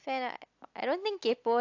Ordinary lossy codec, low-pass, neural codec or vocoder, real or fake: AAC, 48 kbps; 7.2 kHz; none; real